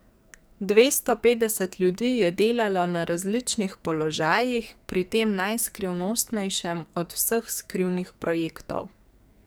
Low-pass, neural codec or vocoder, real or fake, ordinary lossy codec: none; codec, 44.1 kHz, 2.6 kbps, SNAC; fake; none